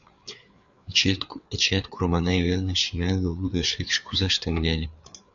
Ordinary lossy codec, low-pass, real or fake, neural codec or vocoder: MP3, 96 kbps; 7.2 kHz; fake; codec, 16 kHz, 4 kbps, FreqCodec, larger model